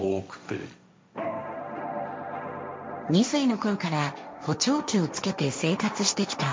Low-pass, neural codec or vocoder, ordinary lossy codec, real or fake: none; codec, 16 kHz, 1.1 kbps, Voila-Tokenizer; none; fake